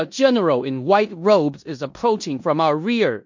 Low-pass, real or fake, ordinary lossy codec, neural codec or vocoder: 7.2 kHz; fake; MP3, 48 kbps; codec, 16 kHz in and 24 kHz out, 0.9 kbps, LongCat-Audio-Codec, four codebook decoder